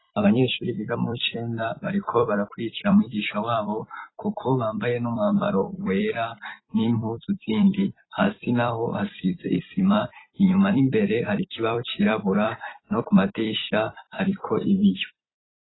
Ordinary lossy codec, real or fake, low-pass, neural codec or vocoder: AAC, 16 kbps; fake; 7.2 kHz; vocoder, 44.1 kHz, 128 mel bands every 256 samples, BigVGAN v2